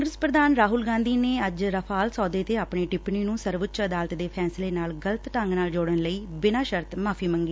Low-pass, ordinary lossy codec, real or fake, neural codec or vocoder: none; none; real; none